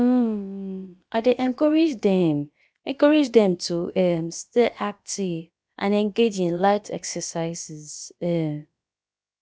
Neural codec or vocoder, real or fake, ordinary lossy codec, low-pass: codec, 16 kHz, about 1 kbps, DyCAST, with the encoder's durations; fake; none; none